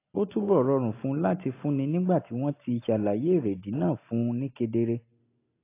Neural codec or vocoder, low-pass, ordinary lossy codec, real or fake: none; 3.6 kHz; AAC, 24 kbps; real